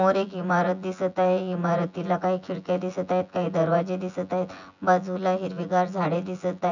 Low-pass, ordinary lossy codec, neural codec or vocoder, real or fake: 7.2 kHz; none; vocoder, 24 kHz, 100 mel bands, Vocos; fake